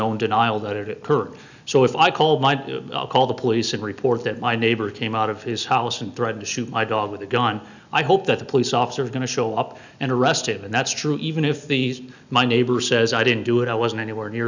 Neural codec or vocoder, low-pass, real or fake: none; 7.2 kHz; real